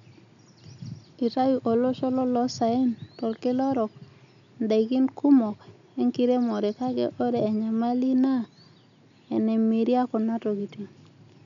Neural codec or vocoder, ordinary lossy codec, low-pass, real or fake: none; none; 7.2 kHz; real